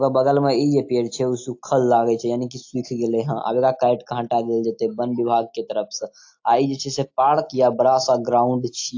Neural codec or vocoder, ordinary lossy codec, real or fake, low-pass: none; AAC, 48 kbps; real; 7.2 kHz